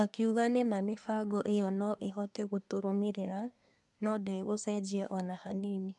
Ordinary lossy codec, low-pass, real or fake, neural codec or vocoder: none; 10.8 kHz; fake; codec, 24 kHz, 1 kbps, SNAC